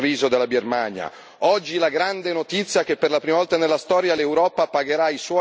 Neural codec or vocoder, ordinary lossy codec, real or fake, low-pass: none; none; real; none